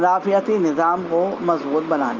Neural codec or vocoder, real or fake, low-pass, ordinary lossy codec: none; real; 7.2 kHz; Opus, 32 kbps